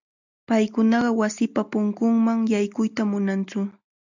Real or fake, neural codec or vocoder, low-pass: real; none; 7.2 kHz